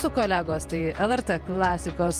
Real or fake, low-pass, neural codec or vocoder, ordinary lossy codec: fake; 14.4 kHz; vocoder, 48 kHz, 128 mel bands, Vocos; Opus, 32 kbps